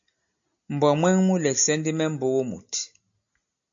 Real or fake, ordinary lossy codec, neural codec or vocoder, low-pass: real; MP3, 64 kbps; none; 7.2 kHz